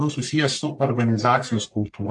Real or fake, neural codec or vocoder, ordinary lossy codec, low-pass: fake; codec, 44.1 kHz, 1.7 kbps, Pupu-Codec; AAC, 64 kbps; 10.8 kHz